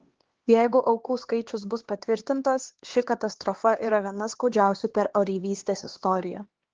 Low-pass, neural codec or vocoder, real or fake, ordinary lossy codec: 7.2 kHz; codec, 16 kHz, 2 kbps, X-Codec, HuBERT features, trained on LibriSpeech; fake; Opus, 16 kbps